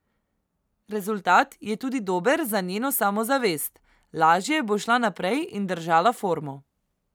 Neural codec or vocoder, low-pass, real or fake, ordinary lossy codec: none; none; real; none